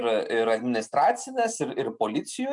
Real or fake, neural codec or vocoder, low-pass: real; none; 10.8 kHz